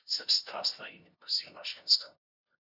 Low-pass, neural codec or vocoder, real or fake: 5.4 kHz; codec, 16 kHz, 0.5 kbps, FunCodec, trained on LibriTTS, 25 frames a second; fake